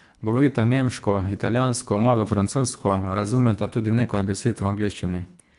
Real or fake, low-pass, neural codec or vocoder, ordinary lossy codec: fake; 10.8 kHz; codec, 24 kHz, 1.5 kbps, HILCodec; none